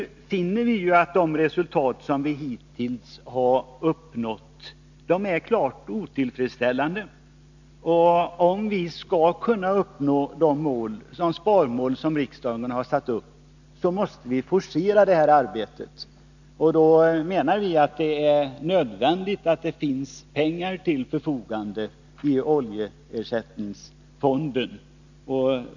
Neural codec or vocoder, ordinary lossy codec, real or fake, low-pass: none; none; real; 7.2 kHz